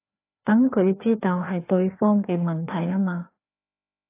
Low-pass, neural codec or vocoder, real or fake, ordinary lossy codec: 3.6 kHz; codec, 16 kHz, 2 kbps, FreqCodec, larger model; fake; AAC, 24 kbps